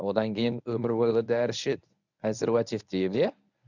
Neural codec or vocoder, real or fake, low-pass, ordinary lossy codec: codec, 24 kHz, 0.9 kbps, WavTokenizer, medium speech release version 1; fake; 7.2 kHz; none